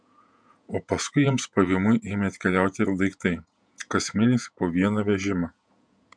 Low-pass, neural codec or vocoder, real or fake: 9.9 kHz; vocoder, 48 kHz, 128 mel bands, Vocos; fake